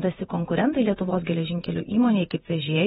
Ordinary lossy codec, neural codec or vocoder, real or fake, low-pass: AAC, 16 kbps; vocoder, 48 kHz, 128 mel bands, Vocos; fake; 19.8 kHz